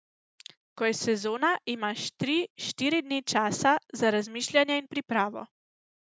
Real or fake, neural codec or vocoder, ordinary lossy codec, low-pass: real; none; none; none